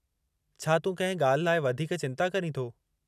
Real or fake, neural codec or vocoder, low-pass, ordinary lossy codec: real; none; 14.4 kHz; none